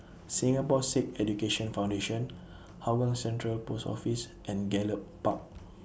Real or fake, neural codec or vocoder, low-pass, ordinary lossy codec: real; none; none; none